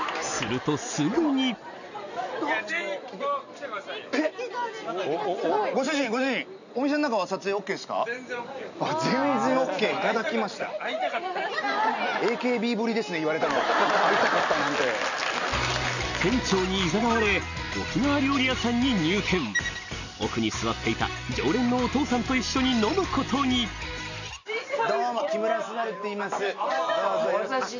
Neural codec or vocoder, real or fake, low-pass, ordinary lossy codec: none; real; 7.2 kHz; none